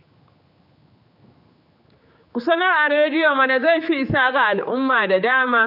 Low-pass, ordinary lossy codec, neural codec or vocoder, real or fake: 5.4 kHz; none; codec, 16 kHz, 4 kbps, X-Codec, HuBERT features, trained on general audio; fake